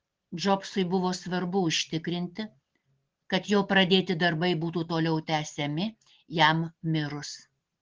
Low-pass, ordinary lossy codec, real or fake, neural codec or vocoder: 7.2 kHz; Opus, 16 kbps; real; none